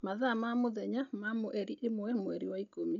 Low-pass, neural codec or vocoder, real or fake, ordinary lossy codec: 7.2 kHz; none; real; none